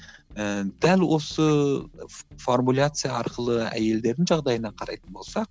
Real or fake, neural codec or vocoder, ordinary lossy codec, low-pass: real; none; none; none